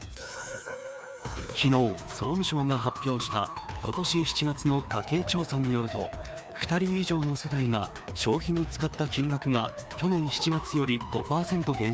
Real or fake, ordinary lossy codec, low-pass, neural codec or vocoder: fake; none; none; codec, 16 kHz, 2 kbps, FreqCodec, larger model